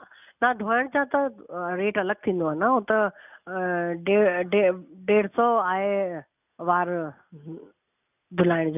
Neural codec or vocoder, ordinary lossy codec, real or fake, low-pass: none; none; real; 3.6 kHz